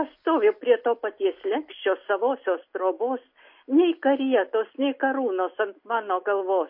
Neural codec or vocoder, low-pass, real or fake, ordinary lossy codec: none; 5.4 kHz; real; MP3, 32 kbps